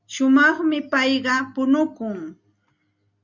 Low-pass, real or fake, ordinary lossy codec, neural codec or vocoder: 7.2 kHz; real; Opus, 64 kbps; none